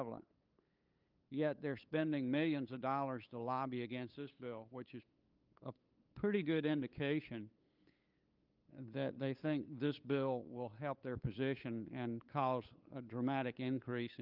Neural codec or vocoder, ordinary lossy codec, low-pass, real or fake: codec, 16 kHz, 8 kbps, FunCodec, trained on LibriTTS, 25 frames a second; Opus, 24 kbps; 5.4 kHz; fake